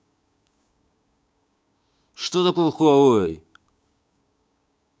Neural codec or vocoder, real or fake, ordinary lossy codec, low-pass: codec, 16 kHz, 6 kbps, DAC; fake; none; none